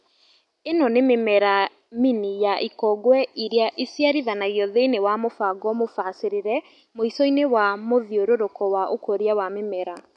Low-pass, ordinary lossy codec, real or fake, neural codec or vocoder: 10.8 kHz; none; real; none